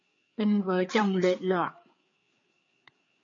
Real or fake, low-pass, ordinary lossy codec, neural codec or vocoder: fake; 7.2 kHz; MP3, 48 kbps; codec, 16 kHz, 4 kbps, FreqCodec, larger model